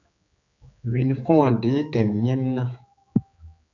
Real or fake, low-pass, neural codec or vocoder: fake; 7.2 kHz; codec, 16 kHz, 4 kbps, X-Codec, HuBERT features, trained on general audio